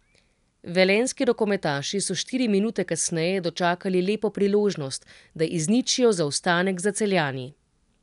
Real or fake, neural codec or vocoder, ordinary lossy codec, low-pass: real; none; none; 10.8 kHz